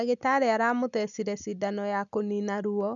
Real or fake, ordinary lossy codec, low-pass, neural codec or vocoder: real; none; 7.2 kHz; none